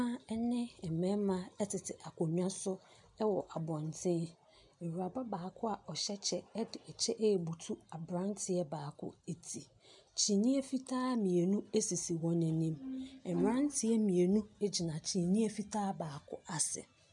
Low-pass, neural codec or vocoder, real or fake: 10.8 kHz; none; real